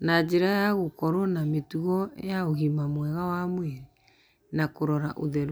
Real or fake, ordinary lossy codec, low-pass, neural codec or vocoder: real; none; none; none